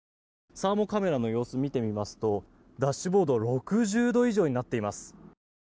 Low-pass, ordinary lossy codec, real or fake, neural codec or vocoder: none; none; real; none